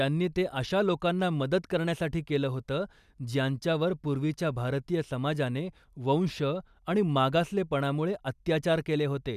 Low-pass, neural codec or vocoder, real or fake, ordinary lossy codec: 14.4 kHz; none; real; none